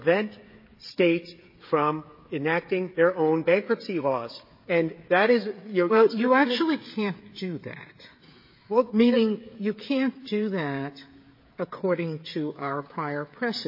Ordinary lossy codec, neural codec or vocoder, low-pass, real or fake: MP3, 24 kbps; codec, 16 kHz, 16 kbps, FreqCodec, smaller model; 5.4 kHz; fake